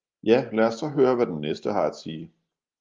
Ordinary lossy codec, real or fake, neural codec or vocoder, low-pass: Opus, 32 kbps; real; none; 7.2 kHz